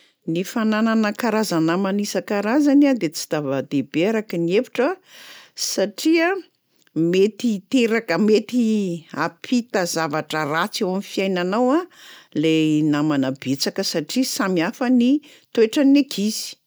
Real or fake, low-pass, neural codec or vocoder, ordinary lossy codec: real; none; none; none